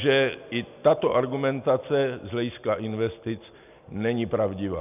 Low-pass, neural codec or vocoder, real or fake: 3.6 kHz; none; real